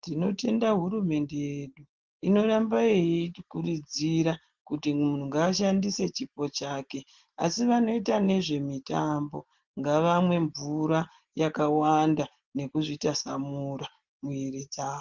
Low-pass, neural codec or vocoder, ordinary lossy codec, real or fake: 7.2 kHz; none; Opus, 16 kbps; real